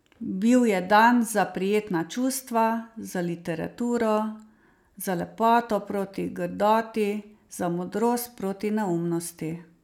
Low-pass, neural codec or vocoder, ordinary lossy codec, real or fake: 19.8 kHz; none; none; real